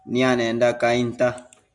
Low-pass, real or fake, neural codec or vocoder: 10.8 kHz; real; none